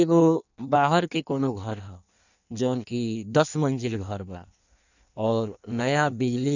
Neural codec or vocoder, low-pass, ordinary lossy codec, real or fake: codec, 16 kHz in and 24 kHz out, 1.1 kbps, FireRedTTS-2 codec; 7.2 kHz; none; fake